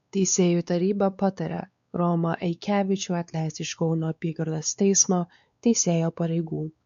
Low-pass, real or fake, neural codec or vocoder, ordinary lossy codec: 7.2 kHz; fake; codec, 16 kHz, 4 kbps, X-Codec, WavLM features, trained on Multilingual LibriSpeech; MP3, 64 kbps